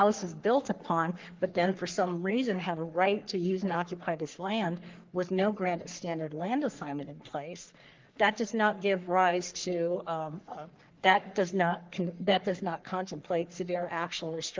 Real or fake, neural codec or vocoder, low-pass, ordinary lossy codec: fake; codec, 44.1 kHz, 3.4 kbps, Pupu-Codec; 7.2 kHz; Opus, 24 kbps